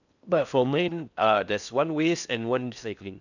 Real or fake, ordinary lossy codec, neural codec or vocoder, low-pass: fake; none; codec, 16 kHz in and 24 kHz out, 0.6 kbps, FocalCodec, streaming, 4096 codes; 7.2 kHz